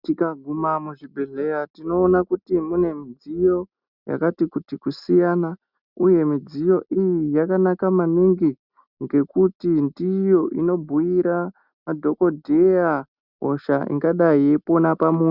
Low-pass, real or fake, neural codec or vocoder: 5.4 kHz; real; none